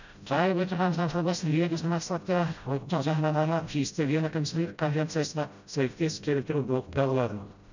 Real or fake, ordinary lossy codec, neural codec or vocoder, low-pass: fake; none; codec, 16 kHz, 0.5 kbps, FreqCodec, smaller model; 7.2 kHz